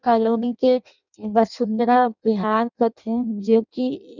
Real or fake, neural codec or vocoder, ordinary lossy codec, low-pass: fake; codec, 16 kHz in and 24 kHz out, 0.6 kbps, FireRedTTS-2 codec; none; 7.2 kHz